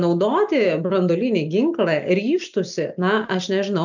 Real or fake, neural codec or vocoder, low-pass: real; none; 7.2 kHz